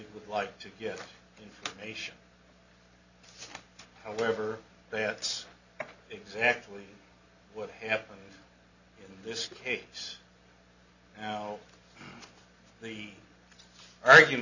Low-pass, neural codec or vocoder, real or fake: 7.2 kHz; none; real